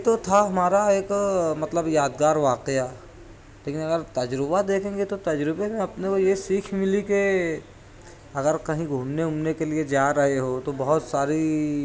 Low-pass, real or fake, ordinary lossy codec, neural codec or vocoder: none; real; none; none